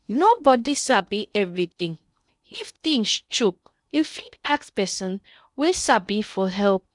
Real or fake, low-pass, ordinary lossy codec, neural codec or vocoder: fake; 10.8 kHz; none; codec, 16 kHz in and 24 kHz out, 0.6 kbps, FocalCodec, streaming, 2048 codes